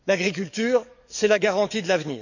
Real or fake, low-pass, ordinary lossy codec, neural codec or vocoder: fake; 7.2 kHz; none; codec, 44.1 kHz, 7.8 kbps, DAC